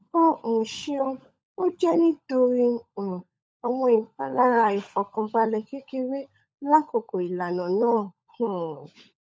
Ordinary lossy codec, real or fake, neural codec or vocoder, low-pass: none; fake; codec, 16 kHz, 16 kbps, FunCodec, trained on LibriTTS, 50 frames a second; none